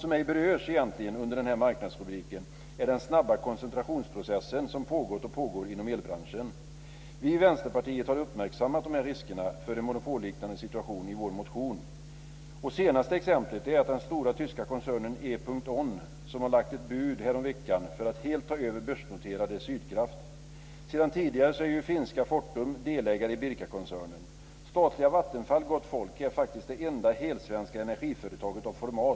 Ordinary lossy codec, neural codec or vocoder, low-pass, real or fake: none; none; none; real